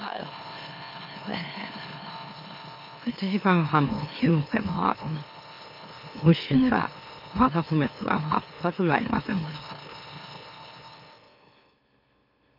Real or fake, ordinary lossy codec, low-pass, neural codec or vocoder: fake; MP3, 32 kbps; 5.4 kHz; autoencoder, 44.1 kHz, a latent of 192 numbers a frame, MeloTTS